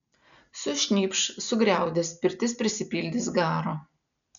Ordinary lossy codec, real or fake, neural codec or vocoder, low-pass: MP3, 96 kbps; real; none; 7.2 kHz